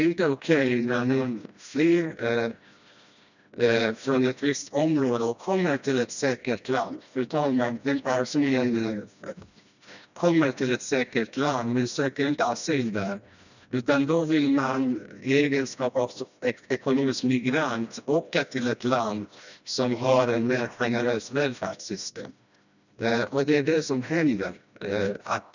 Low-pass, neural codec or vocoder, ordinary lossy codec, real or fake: 7.2 kHz; codec, 16 kHz, 1 kbps, FreqCodec, smaller model; none; fake